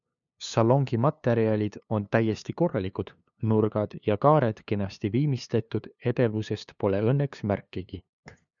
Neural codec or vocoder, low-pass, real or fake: codec, 16 kHz, 2 kbps, FunCodec, trained on LibriTTS, 25 frames a second; 7.2 kHz; fake